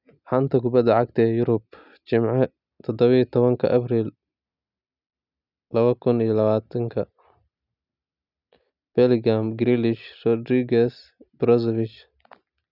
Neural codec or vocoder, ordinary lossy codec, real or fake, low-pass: none; none; real; 5.4 kHz